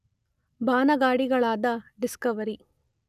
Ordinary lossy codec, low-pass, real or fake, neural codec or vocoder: none; 14.4 kHz; real; none